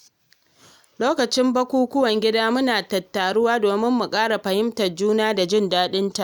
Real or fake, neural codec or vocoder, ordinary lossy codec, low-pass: real; none; none; none